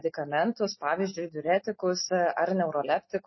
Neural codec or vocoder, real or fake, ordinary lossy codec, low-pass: none; real; MP3, 24 kbps; 7.2 kHz